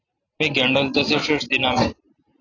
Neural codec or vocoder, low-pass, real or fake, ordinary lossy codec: none; 7.2 kHz; real; AAC, 32 kbps